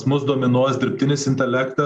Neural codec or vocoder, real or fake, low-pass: none; real; 10.8 kHz